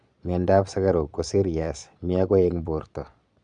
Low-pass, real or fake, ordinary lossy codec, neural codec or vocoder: 9.9 kHz; fake; none; vocoder, 22.05 kHz, 80 mel bands, Vocos